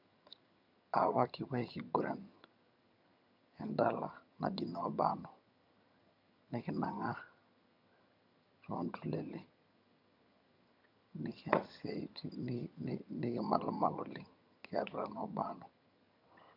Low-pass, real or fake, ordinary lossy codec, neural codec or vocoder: 5.4 kHz; fake; MP3, 48 kbps; vocoder, 22.05 kHz, 80 mel bands, HiFi-GAN